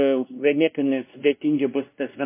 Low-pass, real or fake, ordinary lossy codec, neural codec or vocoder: 3.6 kHz; fake; MP3, 24 kbps; codec, 16 kHz, 1 kbps, X-Codec, WavLM features, trained on Multilingual LibriSpeech